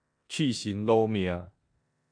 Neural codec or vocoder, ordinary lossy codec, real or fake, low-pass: codec, 16 kHz in and 24 kHz out, 0.9 kbps, LongCat-Audio-Codec, four codebook decoder; MP3, 96 kbps; fake; 9.9 kHz